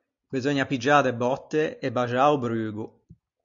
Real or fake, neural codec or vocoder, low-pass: real; none; 7.2 kHz